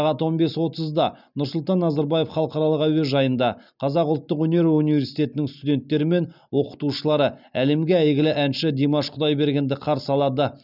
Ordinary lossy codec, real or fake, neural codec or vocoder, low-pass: none; real; none; 5.4 kHz